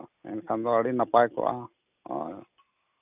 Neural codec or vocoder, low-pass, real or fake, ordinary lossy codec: none; 3.6 kHz; real; none